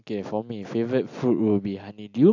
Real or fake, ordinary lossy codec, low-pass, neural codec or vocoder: real; AAC, 48 kbps; 7.2 kHz; none